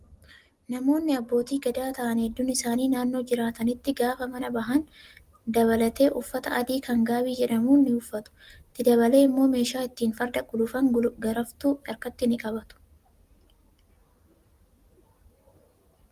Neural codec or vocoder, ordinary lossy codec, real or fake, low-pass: none; Opus, 24 kbps; real; 14.4 kHz